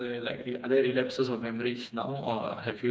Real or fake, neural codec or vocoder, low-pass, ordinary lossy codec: fake; codec, 16 kHz, 2 kbps, FreqCodec, smaller model; none; none